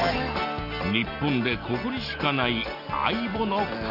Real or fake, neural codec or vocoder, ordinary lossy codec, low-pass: real; none; none; 5.4 kHz